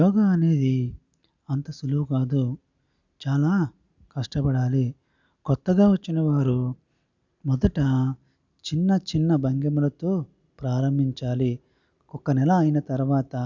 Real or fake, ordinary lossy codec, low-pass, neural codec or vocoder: real; none; 7.2 kHz; none